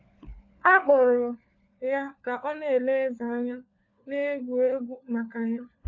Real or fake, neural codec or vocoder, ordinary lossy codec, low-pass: fake; codec, 16 kHz, 4 kbps, FunCodec, trained on LibriTTS, 50 frames a second; AAC, 48 kbps; 7.2 kHz